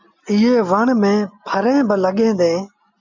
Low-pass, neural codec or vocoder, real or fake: 7.2 kHz; none; real